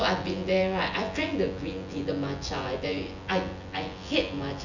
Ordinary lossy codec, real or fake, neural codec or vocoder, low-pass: none; fake; vocoder, 24 kHz, 100 mel bands, Vocos; 7.2 kHz